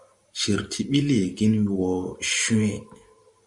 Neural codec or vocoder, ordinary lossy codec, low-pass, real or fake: vocoder, 44.1 kHz, 128 mel bands every 512 samples, BigVGAN v2; Opus, 64 kbps; 10.8 kHz; fake